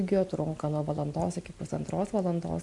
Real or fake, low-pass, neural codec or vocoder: real; 10.8 kHz; none